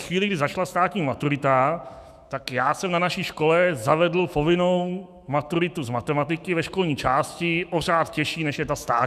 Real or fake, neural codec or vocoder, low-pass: fake; autoencoder, 48 kHz, 128 numbers a frame, DAC-VAE, trained on Japanese speech; 14.4 kHz